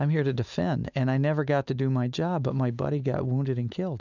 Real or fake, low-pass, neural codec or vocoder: real; 7.2 kHz; none